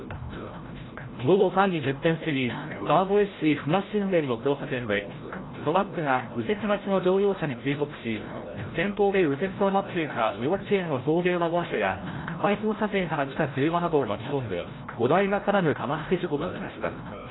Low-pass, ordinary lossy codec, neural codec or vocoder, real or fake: 7.2 kHz; AAC, 16 kbps; codec, 16 kHz, 0.5 kbps, FreqCodec, larger model; fake